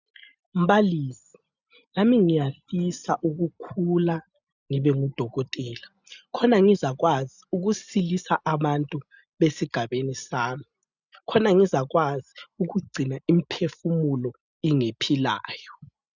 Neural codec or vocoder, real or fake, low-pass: none; real; 7.2 kHz